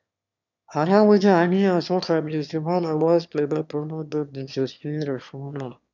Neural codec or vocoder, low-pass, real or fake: autoencoder, 22.05 kHz, a latent of 192 numbers a frame, VITS, trained on one speaker; 7.2 kHz; fake